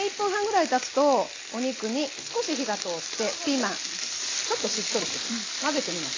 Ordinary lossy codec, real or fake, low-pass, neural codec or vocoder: AAC, 32 kbps; real; 7.2 kHz; none